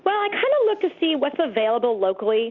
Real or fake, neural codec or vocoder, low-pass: real; none; 7.2 kHz